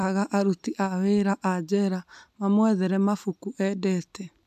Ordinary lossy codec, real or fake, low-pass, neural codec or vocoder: AAC, 96 kbps; real; 14.4 kHz; none